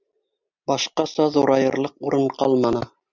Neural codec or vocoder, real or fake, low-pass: none; real; 7.2 kHz